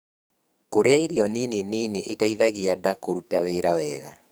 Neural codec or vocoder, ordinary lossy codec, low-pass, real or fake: codec, 44.1 kHz, 2.6 kbps, SNAC; none; none; fake